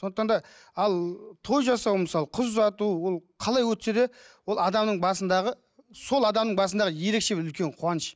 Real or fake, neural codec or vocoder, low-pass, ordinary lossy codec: real; none; none; none